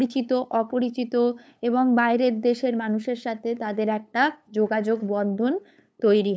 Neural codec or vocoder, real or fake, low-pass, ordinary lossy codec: codec, 16 kHz, 8 kbps, FunCodec, trained on LibriTTS, 25 frames a second; fake; none; none